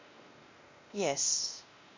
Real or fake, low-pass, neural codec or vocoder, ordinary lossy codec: fake; 7.2 kHz; codec, 16 kHz, 1 kbps, X-Codec, WavLM features, trained on Multilingual LibriSpeech; MP3, 48 kbps